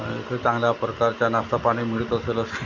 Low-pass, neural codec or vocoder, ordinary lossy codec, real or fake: 7.2 kHz; none; MP3, 64 kbps; real